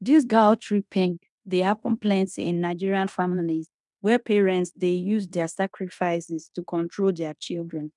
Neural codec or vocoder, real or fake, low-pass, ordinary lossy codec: codec, 16 kHz in and 24 kHz out, 0.9 kbps, LongCat-Audio-Codec, fine tuned four codebook decoder; fake; 10.8 kHz; none